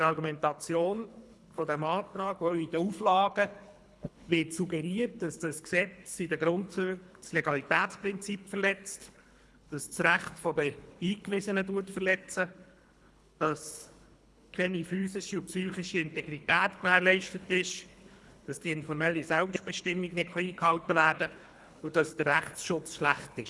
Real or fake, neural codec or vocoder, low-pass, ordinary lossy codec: fake; codec, 24 kHz, 3 kbps, HILCodec; none; none